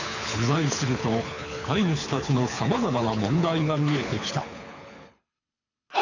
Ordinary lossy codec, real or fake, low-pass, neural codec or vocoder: none; fake; 7.2 kHz; codec, 24 kHz, 6 kbps, HILCodec